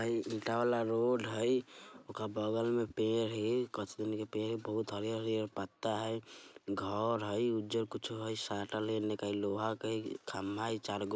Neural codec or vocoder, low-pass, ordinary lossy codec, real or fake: none; none; none; real